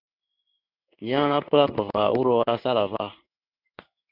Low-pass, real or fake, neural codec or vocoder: 5.4 kHz; fake; codec, 16 kHz in and 24 kHz out, 1 kbps, XY-Tokenizer